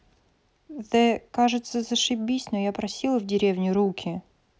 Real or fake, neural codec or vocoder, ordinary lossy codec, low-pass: real; none; none; none